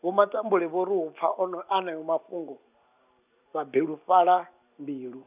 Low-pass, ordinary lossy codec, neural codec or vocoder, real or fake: 3.6 kHz; none; none; real